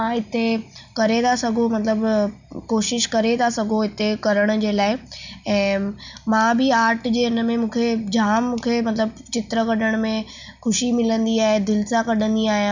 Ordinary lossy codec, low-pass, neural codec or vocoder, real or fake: none; 7.2 kHz; none; real